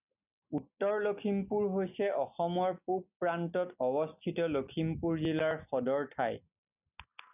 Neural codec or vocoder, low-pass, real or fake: none; 3.6 kHz; real